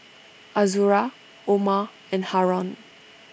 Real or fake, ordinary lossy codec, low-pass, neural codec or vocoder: real; none; none; none